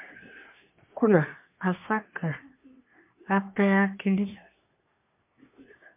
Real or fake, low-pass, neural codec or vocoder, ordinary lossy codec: fake; 3.6 kHz; codec, 24 kHz, 1 kbps, SNAC; MP3, 32 kbps